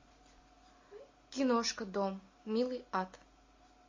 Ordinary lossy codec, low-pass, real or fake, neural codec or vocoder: MP3, 32 kbps; 7.2 kHz; real; none